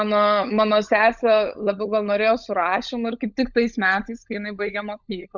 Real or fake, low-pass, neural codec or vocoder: fake; 7.2 kHz; codec, 16 kHz, 16 kbps, FunCodec, trained on LibriTTS, 50 frames a second